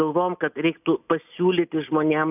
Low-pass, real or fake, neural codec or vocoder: 3.6 kHz; real; none